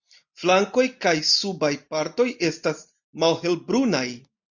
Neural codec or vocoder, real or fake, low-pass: none; real; 7.2 kHz